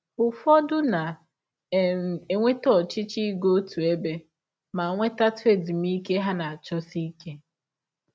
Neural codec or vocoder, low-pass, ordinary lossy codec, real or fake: none; none; none; real